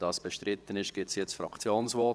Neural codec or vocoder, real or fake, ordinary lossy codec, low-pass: vocoder, 22.05 kHz, 80 mel bands, WaveNeXt; fake; none; none